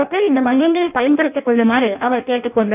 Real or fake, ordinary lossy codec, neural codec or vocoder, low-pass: fake; none; codec, 16 kHz in and 24 kHz out, 0.6 kbps, FireRedTTS-2 codec; 3.6 kHz